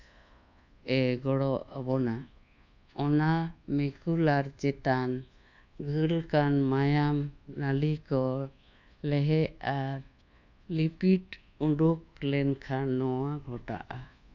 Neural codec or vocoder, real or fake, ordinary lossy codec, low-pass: codec, 24 kHz, 1.2 kbps, DualCodec; fake; none; 7.2 kHz